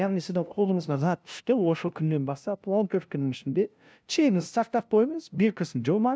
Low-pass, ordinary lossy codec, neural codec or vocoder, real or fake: none; none; codec, 16 kHz, 0.5 kbps, FunCodec, trained on LibriTTS, 25 frames a second; fake